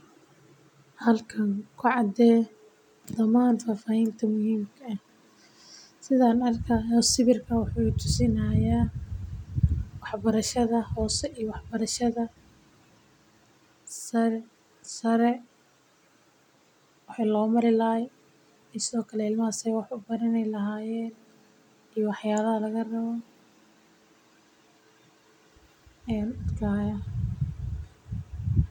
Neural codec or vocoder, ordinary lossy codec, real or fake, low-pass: none; none; real; 19.8 kHz